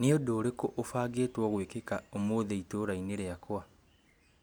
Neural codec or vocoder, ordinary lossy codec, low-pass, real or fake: none; none; none; real